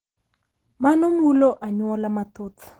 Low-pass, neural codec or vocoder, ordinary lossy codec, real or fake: 19.8 kHz; none; Opus, 16 kbps; real